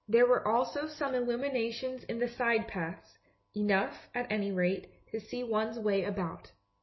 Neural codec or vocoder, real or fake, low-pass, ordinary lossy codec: none; real; 7.2 kHz; MP3, 24 kbps